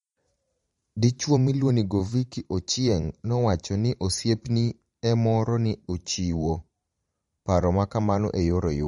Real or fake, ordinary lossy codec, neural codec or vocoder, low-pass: fake; MP3, 48 kbps; vocoder, 44.1 kHz, 128 mel bands every 512 samples, BigVGAN v2; 19.8 kHz